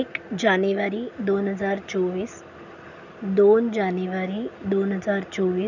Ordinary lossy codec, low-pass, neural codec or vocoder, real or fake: none; 7.2 kHz; none; real